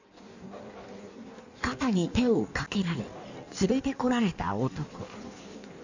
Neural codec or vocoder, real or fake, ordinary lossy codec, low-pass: codec, 16 kHz in and 24 kHz out, 1.1 kbps, FireRedTTS-2 codec; fake; none; 7.2 kHz